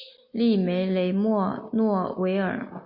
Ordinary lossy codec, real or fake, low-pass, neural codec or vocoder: MP3, 32 kbps; real; 5.4 kHz; none